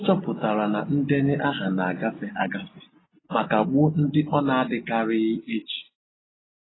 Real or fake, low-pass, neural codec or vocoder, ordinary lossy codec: real; 7.2 kHz; none; AAC, 16 kbps